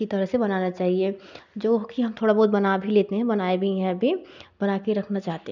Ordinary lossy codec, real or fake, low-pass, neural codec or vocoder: none; real; 7.2 kHz; none